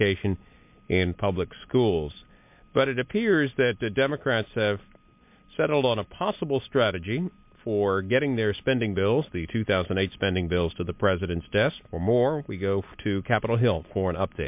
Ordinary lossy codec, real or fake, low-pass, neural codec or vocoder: MP3, 32 kbps; real; 3.6 kHz; none